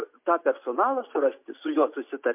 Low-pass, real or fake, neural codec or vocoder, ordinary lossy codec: 3.6 kHz; real; none; MP3, 32 kbps